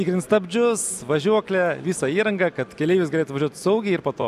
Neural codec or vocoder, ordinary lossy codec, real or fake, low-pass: none; AAC, 96 kbps; real; 14.4 kHz